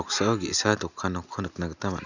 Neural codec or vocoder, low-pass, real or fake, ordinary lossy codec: none; 7.2 kHz; real; none